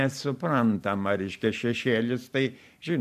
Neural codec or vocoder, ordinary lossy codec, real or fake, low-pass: vocoder, 44.1 kHz, 128 mel bands every 512 samples, BigVGAN v2; AAC, 96 kbps; fake; 14.4 kHz